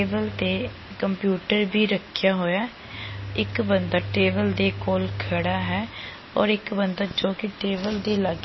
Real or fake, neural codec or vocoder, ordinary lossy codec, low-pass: real; none; MP3, 24 kbps; 7.2 kHz